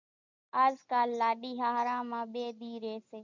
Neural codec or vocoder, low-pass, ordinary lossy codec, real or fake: none; 7.2 kHz; MP3, 64 kbps; real